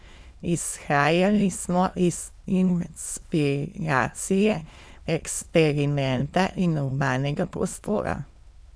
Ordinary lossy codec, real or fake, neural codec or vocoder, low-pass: none; fake; autoencoder, 22.05 kHz, a latent of 192 numbers a frame, VITS, trained on many speakers; none